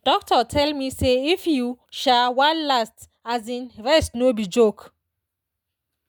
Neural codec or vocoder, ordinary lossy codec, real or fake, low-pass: none; none; real; none